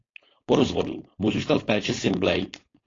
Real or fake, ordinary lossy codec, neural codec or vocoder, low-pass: fake; AAC, 32 kbps; codec, 16 kHz, 4.8 kbps, FACodec; 7.2 kHz